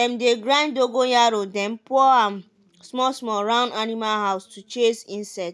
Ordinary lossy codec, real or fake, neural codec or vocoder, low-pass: none; real; none; none